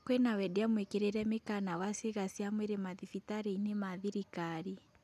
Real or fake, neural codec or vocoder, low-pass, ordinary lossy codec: real; none; 14.4 kHz; none